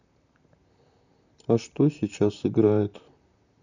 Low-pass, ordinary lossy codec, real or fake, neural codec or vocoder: 7.2 kHz; none; fake; vocoder, 44.1 kHz, 128 mel bands, Pupu-Vocoder